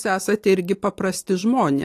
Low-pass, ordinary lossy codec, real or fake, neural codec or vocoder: 14.4 kHz; AAC, 64 kbps; real; none